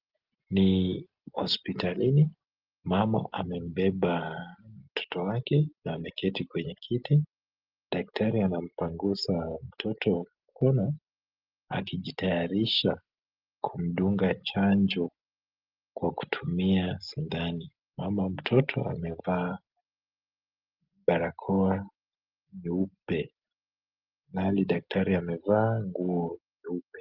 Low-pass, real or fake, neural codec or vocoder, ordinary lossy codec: 5.4 kHz; real; none; Opus, 24 kbps